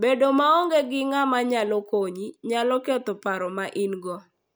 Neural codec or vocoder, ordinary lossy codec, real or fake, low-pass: none; none; real; none